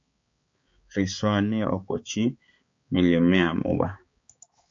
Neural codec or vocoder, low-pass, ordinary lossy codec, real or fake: codec, 16 kHz, 4 kbps, X-Codec, HuBERT features, trained on balanced general audio; 7.2 kHz; MP3, 48 kbps; fake